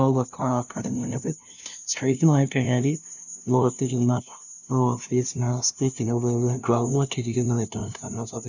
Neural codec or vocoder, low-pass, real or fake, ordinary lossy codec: codec, 16 kHz, 0.5 kbps, FunCodec, trained on LibriTTS, 25 frames a second; 7.2 kHz; fake; none